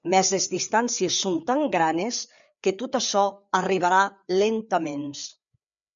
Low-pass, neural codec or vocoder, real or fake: 7.2 kHz; codec, 16 kHz, 4 kbps, FreqCodec, larger model; fake